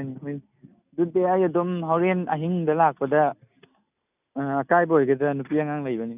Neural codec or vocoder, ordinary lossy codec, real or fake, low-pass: none; none; real; 3.6 kHz